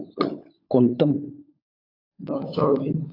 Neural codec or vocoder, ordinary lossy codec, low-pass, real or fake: codec, 16 kHz, 16 kbps, FunCodec, trained on LibriTTS, 50 frames a second; AAC, 32 kbps; 5.4 kHz; fake